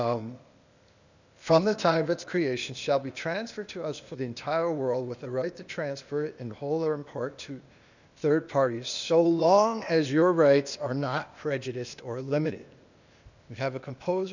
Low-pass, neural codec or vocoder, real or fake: 7.2 kHz; codec, 16 kHz, 0.8 kbps, ZipCodec; fake